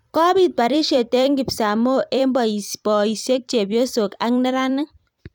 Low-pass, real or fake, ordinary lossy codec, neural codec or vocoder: 19.8 kHz; fake; none; vocoder, 44.1 kHz, 128 mel bands every 256 samples, BigVGAN v2